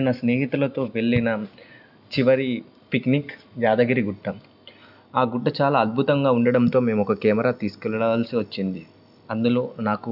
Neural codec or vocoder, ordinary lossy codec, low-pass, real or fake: none; none; 5.4 kHz; real